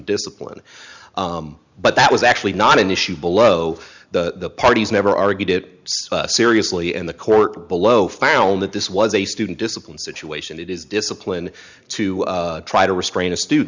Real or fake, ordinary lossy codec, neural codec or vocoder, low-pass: real; Opus, 64 kbps; none; 7.2 kHz